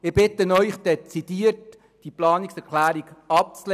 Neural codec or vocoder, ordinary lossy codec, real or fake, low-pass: none; none; real; 14.4 kHz